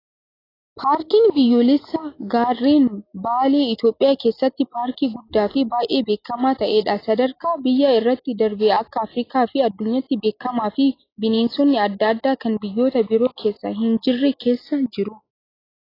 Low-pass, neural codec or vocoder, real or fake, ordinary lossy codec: 5.4 kHz; none; real; AAC, 24 kbps